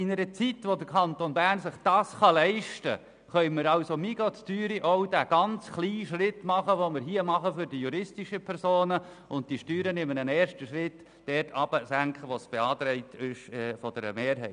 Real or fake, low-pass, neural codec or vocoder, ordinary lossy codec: real; 9.9 kHz; none; none